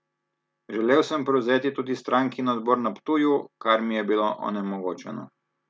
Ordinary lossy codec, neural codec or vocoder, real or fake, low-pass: none; none; real; none